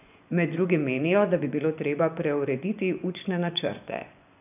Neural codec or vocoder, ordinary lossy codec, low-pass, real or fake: vocoder, 24 kHz, 100 mel bands, Vocos; none; 3.6 kHz; fake